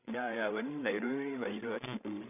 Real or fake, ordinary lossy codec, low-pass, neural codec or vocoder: fake; none; 3.6 kHz; codec, 16 kHz, 16 kbps, FreqCodec, larger model